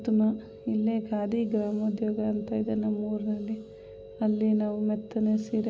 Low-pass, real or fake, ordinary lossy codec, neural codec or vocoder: none; real; none; none